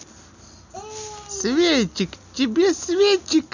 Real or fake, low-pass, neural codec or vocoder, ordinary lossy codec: real; 7.2 kHz; none; none